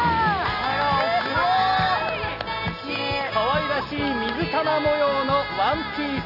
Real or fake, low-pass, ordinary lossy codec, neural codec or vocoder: real; 5.4 kHz; none; none